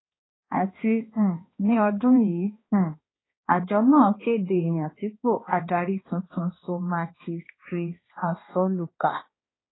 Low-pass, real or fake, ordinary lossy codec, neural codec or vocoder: 7.2 kHz; fake; AAC, 16 kbps; codec, 16 kHz, 2 kbps, X-Codec, HuBERT features, trained on balanced general audio